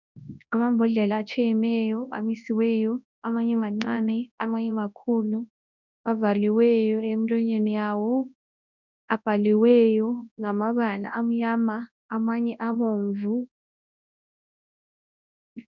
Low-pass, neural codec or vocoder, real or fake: 7.2 kHz; codec, 24 kHz, 0.9 kbps, WavTokenizer, large speech release; fake